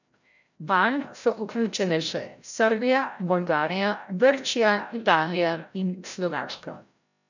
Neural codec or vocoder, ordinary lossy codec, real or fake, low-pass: codec, 16 kHz, 0.5 kbps, FreqCodec, larger model; none; fake; 7.2 kHz